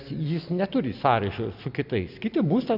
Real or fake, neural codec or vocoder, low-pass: real; none; 5.4 kHz